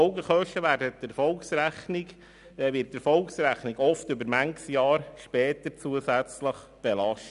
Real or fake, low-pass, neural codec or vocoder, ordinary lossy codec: real; 10.8 kHz; none; none